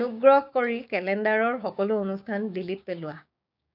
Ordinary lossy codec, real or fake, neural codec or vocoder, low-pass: none; fake; codec, 16 kHz, 6 kbps, DAC; 5.4 kHz